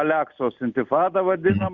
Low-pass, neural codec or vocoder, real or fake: 7.2 kHz; none; real